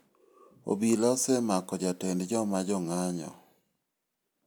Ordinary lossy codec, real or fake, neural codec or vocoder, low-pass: none; real; none; none